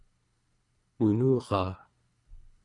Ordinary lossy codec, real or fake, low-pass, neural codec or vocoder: Opus, 64 kbps; fake; 10.8 kHz; codec, 24 kHz, 3 kbps, HILCodec